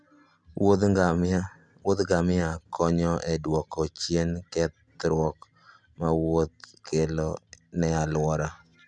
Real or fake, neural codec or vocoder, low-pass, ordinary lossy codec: real; none; none; none